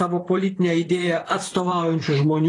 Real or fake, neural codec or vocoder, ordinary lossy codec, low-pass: fake; vocoder, 44.1 kHz, 128 mel bands, Pupu-Vocoder; AAC, 32 kbps; 10.8 kHz